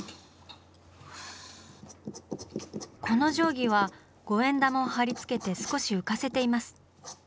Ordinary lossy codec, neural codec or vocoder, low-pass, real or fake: none; none; none; real